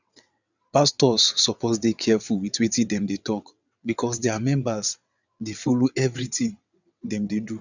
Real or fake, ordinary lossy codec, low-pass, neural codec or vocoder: fake; none; 7.2 kHz; vocoder, 22.05 kHz, 80 mel bands, WaveNeXt